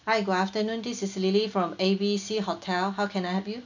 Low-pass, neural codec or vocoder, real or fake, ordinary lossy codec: 7.2 kHz; none; real; none